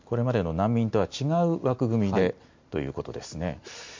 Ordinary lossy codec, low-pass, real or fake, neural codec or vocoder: MP3, 48 kbps; 7.2 kHz; real; none